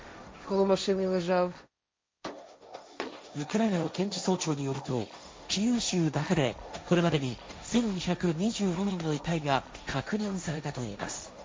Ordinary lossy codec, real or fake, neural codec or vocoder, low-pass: none; fake; codec, 16 kHz, 1.1 kbps, Voila-Tokenizer; none